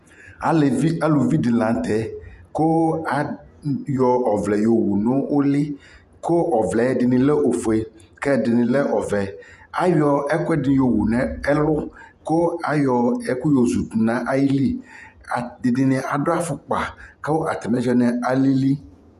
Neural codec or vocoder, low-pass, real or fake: vocoder, 48 kHz, 128 mel bands, Vocos; 14.4 kHz; fake